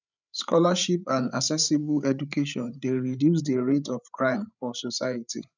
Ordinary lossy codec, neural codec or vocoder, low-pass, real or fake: none; codec, 16 kHz, 8 kbps, FreqCodec, larger model; 7.2 kHz; fake